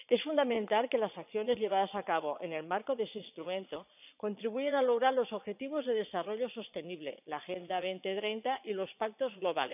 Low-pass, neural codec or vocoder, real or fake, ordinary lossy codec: 3.6 kHz; vocoder, 22.05 kHz, 80 mel bands, Vocos; fake; none